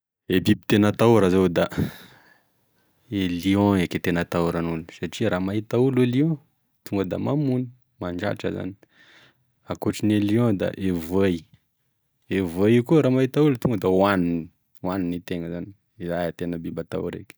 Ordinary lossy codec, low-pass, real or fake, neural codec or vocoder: none; none; real; none